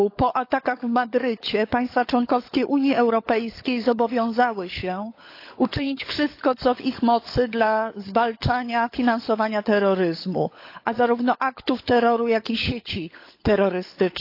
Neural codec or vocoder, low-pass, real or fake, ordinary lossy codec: codec, 16 kHz, 16 kbps, FunCodec, trained on LibriTTS, 50 frames a second; 5.4 kHz; fake; AAC, 32 kbps